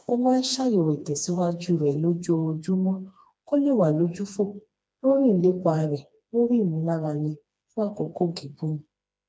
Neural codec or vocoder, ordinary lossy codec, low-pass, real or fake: codec, 16 kHz, 2 kbps, FreqCodec, smaller model; none; none; fake